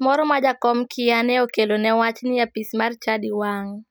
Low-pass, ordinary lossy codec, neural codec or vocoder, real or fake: none; none; none; real